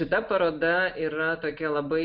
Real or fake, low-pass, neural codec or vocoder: real; 5.4 kHz; none